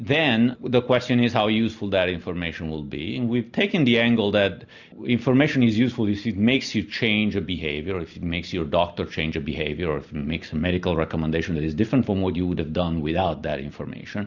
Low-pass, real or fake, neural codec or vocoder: 7.2 kHz; real; none